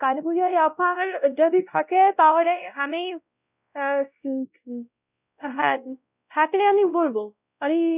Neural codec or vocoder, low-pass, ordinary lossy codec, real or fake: codec, 16 kHz, 0.5 kbps, X-Codec, WavLM features, trained on Multilingual LibriSpeech; 3.6 kHz; none; fake